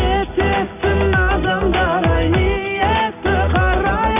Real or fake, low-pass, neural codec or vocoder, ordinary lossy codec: real; 3.6 kHz; none; none